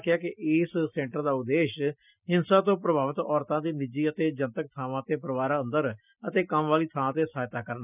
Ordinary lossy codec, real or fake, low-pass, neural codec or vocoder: none; real; 3.6 kHz; none